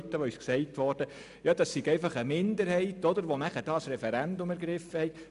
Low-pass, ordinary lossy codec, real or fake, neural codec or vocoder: 10.8 kHz; none; real; none